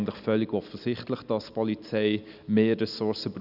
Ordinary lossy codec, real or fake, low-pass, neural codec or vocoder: none; real; 5.4 kHz; none